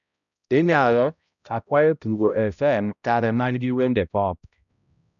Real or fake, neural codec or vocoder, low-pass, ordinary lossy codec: fake; codec, 16 kHz, 0.5 kbps, X-Codec, HuBERT features, trained on balanced general audio; 7.2 kHz; none